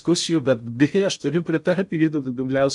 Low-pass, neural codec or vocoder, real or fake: 10.8 kHz; codec, 16 kHz in and 24 kHz out, 0.6 kbps, FocalCodec, streaming, 4096 codes; fake